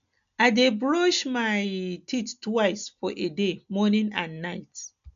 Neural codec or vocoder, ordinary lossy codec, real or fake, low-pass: none; none; real; 7.2 kHz